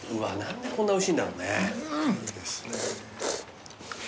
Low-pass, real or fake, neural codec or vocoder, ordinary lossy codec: none; real; none; none